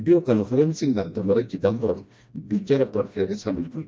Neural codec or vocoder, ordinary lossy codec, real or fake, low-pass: codec, 16 kHz, 1 kbps, FreqCodec, smaller model; none; fake; none